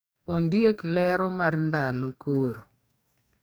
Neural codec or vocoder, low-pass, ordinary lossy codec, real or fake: codec, 44.1 kHz, 2.6 kbps, DAC; none; none; fake